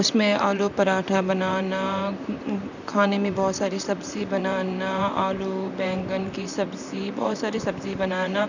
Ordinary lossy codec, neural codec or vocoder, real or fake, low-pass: none; vocoder, 44.1 kHz, 128 mel bands, Pupu-Vocoder; fake; 7.2 kHz